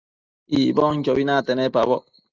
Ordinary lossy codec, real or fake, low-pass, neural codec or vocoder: Opus, 32 kbps; real; 7.2 kHz; none